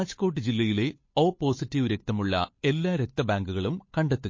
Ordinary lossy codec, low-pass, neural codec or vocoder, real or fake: MP3, 32 kbps; 7.2 kHz; codec, 16 kHz, 8 kbps, FunCodec, trained on Chinese and English, 25 frames a second; fake